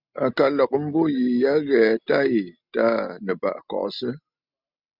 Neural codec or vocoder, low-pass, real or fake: none; 5.4 kHz; real